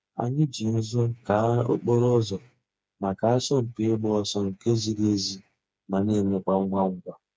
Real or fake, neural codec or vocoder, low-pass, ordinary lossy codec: fake; codec, 16 kHz, 4 kbps, FreqCodec, smaller model; none; none